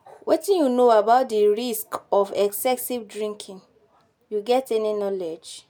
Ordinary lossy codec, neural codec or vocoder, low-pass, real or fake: none; none; none; real